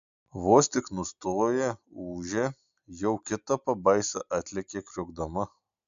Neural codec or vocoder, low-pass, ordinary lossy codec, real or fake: none; 7.2 kHz; AAC, 64 kbps; real